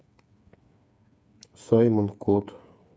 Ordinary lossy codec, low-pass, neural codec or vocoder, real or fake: none; none; codec, 16 kHz, 8 kbps, FreqCodec, smaller model; fake